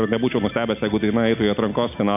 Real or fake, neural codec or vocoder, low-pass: real; none; 3.6 kHz